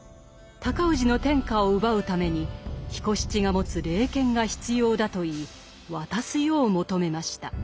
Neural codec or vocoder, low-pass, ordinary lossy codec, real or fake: none; none; none; real